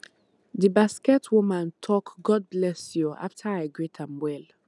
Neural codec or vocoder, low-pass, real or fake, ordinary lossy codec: none; none; real; none